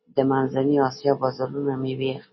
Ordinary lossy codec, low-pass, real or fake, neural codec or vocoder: MP3, 24 kbps; 7.2 kHz; real; none